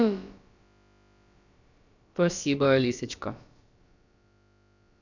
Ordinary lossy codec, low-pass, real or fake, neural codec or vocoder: none; 7.2 kHz; fake; codec, 16 kHz, about 1 kbps, DyCAST, with the encoder's durations